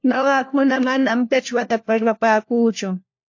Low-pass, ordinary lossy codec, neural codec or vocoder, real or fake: 7.2 kHz; AAC, 48 kbps; codec, 16 kHz, 1 kbps, FunCodec, trained on LibriTTS, 50 frames a second; fake